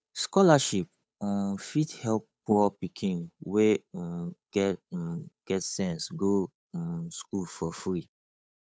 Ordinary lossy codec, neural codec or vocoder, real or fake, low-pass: none; codec, 16 kHz, 8 kbps, FunCodec, trained on Chinese and English, 25 frames a second; fake; none